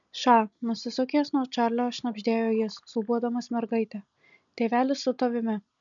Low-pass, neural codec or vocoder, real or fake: 7.2 kHz; none; real